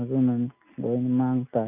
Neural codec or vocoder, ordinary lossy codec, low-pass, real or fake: none; none; 3.6 kHz; real